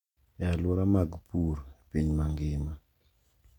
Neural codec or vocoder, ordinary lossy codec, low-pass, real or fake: none; none; 19.8 kHz; real